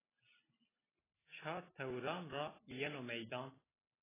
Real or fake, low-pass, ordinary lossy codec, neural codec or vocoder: fake; 3.6 kHz; AAC, 16 kbps; vocoder, 44.1 kHz, 128 mel bands every 256 samples, BigVGAN v2